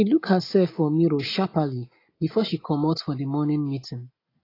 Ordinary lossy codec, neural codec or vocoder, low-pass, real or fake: AAC, 24 kbps; none; 5.4 kHz; real